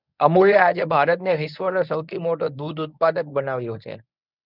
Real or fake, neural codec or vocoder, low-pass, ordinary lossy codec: fake; codec, 24 kHz, 0.9 kbps, WavTokenizer, medium speech release version 1; 5.4 kHz; none